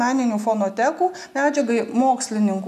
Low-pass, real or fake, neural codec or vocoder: 14.4 kHz; real; none